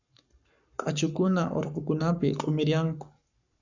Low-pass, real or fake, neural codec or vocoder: 7.2 kHz; fake; codec, 44.1 kHz, 7.8 kbps, Pupu-Codec